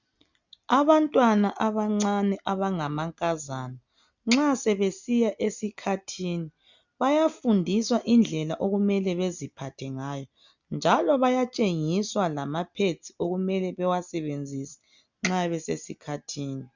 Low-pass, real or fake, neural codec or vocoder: 7.2 kHz; real; none